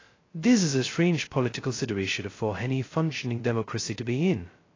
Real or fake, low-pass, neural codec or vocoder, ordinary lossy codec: fake; 7.2 kHz; codec, 16 kHz, 0.2 kbps, FocalCodec; AAC, 32 kbps